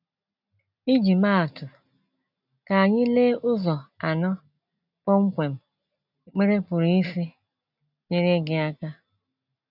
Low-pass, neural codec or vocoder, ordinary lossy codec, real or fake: 5.4 kHz; none; none; real